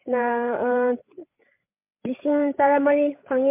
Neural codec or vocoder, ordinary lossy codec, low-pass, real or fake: codec, 16 kHz, 8 kbps, FreqCodec, larger model; MP3, 32 kbps; 3.6 kHz; fake